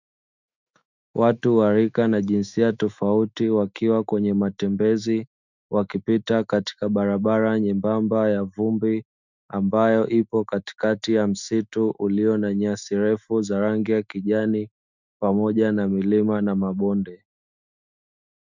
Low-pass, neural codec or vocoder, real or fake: 7.2 kHz; autoencoder, 48 kHz, 128 numbers a frame, DAC-VAE, trained on Japanese speech; fake